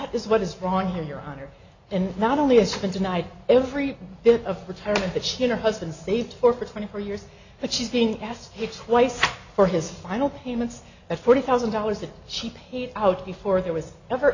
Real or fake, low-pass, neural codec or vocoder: real; 7.2 kHz; none